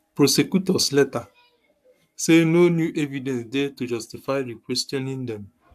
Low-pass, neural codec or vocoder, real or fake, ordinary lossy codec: 14.4 kHz; codec, 44.1 kHz, 7.8 kbps, Pupu-Codec; fake; AAC, 96 kbps